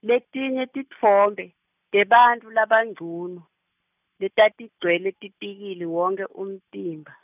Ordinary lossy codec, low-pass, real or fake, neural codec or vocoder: none; 3.6 kHz; real; none